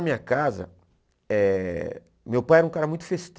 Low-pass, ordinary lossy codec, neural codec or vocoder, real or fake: none; none; none; real